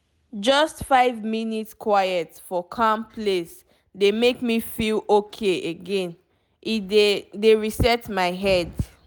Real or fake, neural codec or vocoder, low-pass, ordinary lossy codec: real; none; none; none